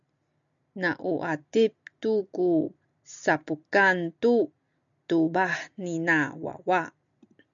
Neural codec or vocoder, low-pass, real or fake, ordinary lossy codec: none; 7.2 kHz; real; MP3, 96 kbps